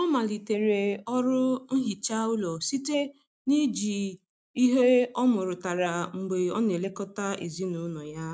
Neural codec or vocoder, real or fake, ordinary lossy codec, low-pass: none; real; none; none